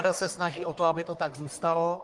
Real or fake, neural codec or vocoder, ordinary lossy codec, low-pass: fake; codec, 44.1 kHz, 1.7 kbps, Pupu-Codec; Opus, 24 kbps; 10.8 kHz